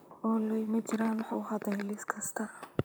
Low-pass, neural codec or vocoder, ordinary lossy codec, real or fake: none; vocoder, 44.1 kHz, 128 mel bands, Pupu-Vocoder; none; fake